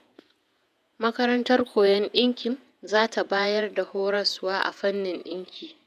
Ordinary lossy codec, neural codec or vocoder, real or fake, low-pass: none; vocoder, 48 kHz, 128 mel bands, Vocos; fake; 14.4 kHz